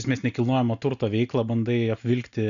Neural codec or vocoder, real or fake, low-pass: none; real; 7.2 kHz